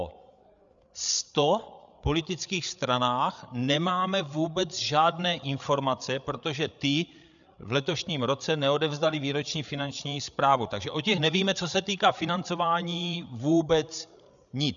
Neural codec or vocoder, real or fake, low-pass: codec, 16 kHz, 8 kbps, FreqCodec, larger model; fake; 7.2 kHz